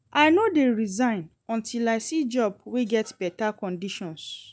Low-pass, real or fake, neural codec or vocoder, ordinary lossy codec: none; real; none; none